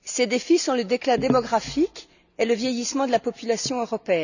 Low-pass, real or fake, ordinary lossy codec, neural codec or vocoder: 7.2 kHz; real; none; none